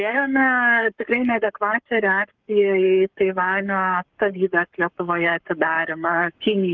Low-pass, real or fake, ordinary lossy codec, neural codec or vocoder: 7.2 kHz; fake; Opus, 24 kbps; codec, 44.1 kHz, 7.8 kbps, Pupu-Codec